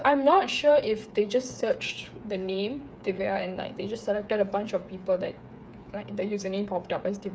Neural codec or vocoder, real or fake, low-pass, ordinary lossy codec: codec, 16 kHz, 8 kbps, FreqCodec, smaller model; fake; none; none